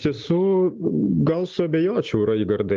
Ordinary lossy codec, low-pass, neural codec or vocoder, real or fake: Opus, 32 kbps; 7.2 kHz; none; real